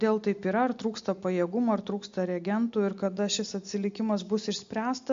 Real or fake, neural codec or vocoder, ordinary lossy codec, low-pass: real; none; MP3, 48 kbps; 7.2 kHz